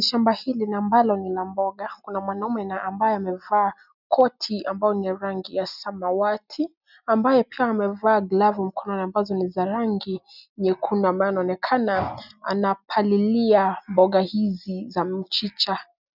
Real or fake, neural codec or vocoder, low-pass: real; none; 5.4 kHz